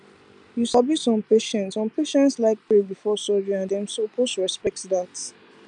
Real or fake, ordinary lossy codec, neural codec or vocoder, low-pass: real; none; none; 9.9 kHz